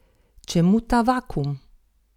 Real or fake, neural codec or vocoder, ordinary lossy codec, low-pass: real; none; none; 19.8 kHz